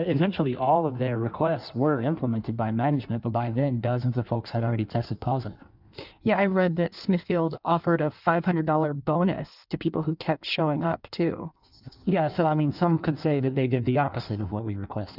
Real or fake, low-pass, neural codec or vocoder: fake; 5.4 kHz; codec, 16 kHz in and 24 kHz out, 1.1 kbps, FireRedTTS-2 codec